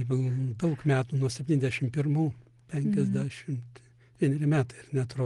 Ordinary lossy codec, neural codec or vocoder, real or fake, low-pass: Opus, 24 kbps; none; real; 10.8 kHz